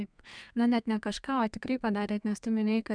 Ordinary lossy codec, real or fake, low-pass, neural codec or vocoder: MP3, 96 kbps; fake; 9.9 kHz; codec, 32 kHz, 1.9 kbps, SNAC